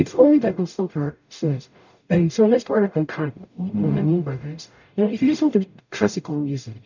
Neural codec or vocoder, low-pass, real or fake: codec, 44.1 kHz, 0.9 kbps, DAC; 7.2 kHz; fake